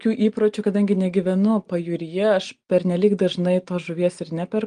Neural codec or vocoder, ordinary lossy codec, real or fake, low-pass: none; Opus, 24 kbps; real; 9.9 kHz